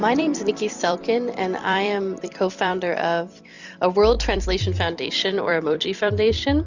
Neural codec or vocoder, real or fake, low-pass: vocoder, 44.1 kHz, 128 mel bands every 256 samples, BigVGAN v2; fake; 7.2 kHz